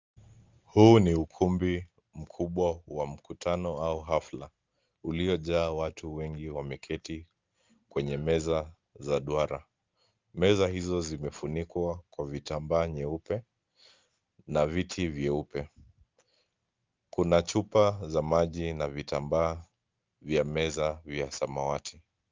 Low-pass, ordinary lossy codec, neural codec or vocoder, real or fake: 7.2 kHz; Opus, 32 kbps; none; real